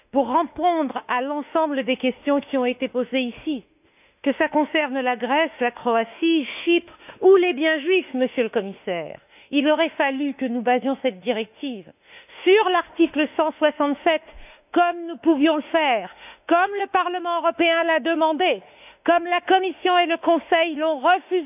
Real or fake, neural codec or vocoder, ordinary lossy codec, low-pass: fake; autoencoder, 48 kHz, 32 numbers a frame, DAC-VAE, trained on Japanese speech; none; 3.6 kHz